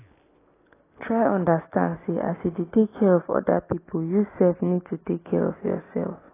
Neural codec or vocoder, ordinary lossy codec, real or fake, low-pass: none; AAC, 16 kbps; real; 3.6 kHz